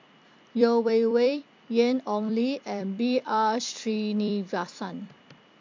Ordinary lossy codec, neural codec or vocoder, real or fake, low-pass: MP3, 48 kbps; vocoder, 44.1 kHz, 128 mel bands every 256 samples, BigVGAN v2; fake; 7.2 kHz